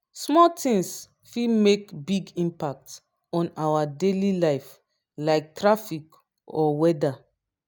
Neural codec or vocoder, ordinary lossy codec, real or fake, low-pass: none; none; real; none